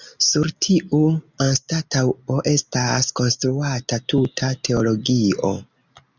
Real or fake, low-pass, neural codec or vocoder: real; 7.2 kHz; none